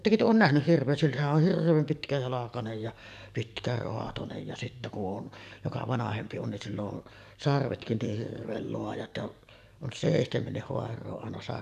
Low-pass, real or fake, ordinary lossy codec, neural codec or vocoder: 14.4 kHz; fake; none; codec, 44.1 kHz, 7.8 kbps, DAC